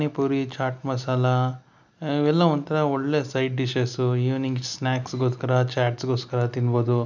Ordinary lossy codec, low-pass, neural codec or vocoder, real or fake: none; 7.2 kHz; none; real